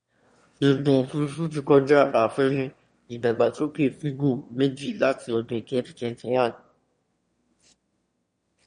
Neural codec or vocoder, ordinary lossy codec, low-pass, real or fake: autoencoder, 22.05 kHz, a latent of 192 numbers a frame, VITS, trained on one speaker; MP3, 48 kbps; 9.9 kHz; fake